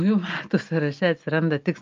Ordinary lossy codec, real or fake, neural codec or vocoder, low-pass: Opus, 24 kbps; real; none; 7.2 kHz